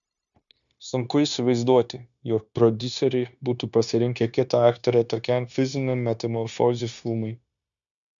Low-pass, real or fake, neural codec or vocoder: 7.2 kHz; fake; codec, 16 kHz, 0.9 kbps, LongCat-Audio-Codec